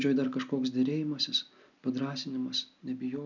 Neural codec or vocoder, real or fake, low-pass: none; real; 7.2 kHz